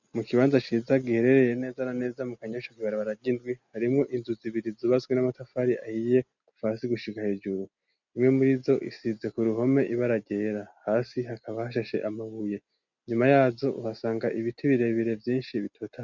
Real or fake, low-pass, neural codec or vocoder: real; 7.2 kHz; none